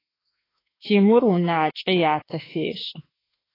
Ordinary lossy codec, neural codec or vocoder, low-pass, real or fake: AAC, 24 kbps; autoencoder, 48 kHz, 32 numbers a frame, DAC-VAE, trained on Japanese speech; 5.4 kHz; fake